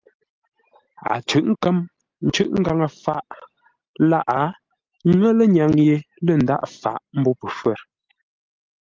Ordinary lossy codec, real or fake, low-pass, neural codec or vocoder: Opus, 24 kbps; real; 7.2 kHz; none